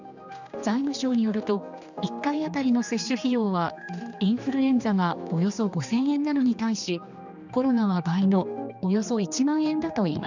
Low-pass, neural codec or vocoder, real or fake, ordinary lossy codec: 7.2 kHz; codec, 16 kHz, 2 kbps, X-Codec, HuBERT features, trained on general audio; fake; none